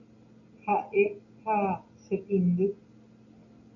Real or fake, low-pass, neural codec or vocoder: real; 7.2 kHz; none